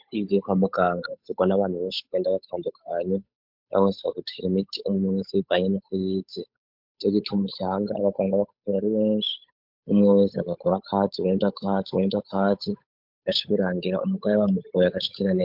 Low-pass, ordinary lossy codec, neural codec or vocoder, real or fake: 5.4 kHz; MP3, 48 kbps; codec, 16 kHz, 8 kbps, FunCodec, trained on Chinese and English, 25 frames a second; fake